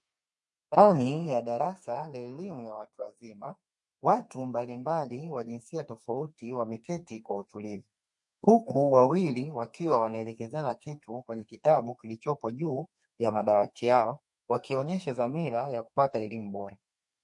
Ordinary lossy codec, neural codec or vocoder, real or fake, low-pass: MP3, 48 kbps; codec, 32 kHz, 1.9 kbps, SNAC; fake; 10.8 kHz